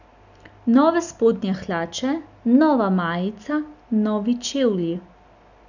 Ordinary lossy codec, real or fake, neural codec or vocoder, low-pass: none; real; none; 7.2 kHz